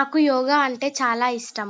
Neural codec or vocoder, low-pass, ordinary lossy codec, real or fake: none; none; none; real